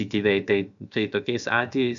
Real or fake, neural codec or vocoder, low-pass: fake; codec, 16 kHz, about 1 kbps, DyCAST, with the encoder's durations; 7.2 kHz